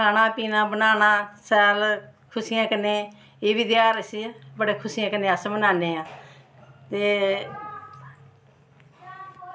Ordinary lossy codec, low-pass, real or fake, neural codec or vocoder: none; none; real; none